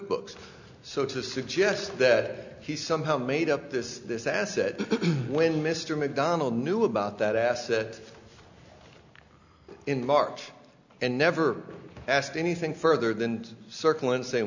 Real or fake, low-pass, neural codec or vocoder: real; 7.2 kHz; none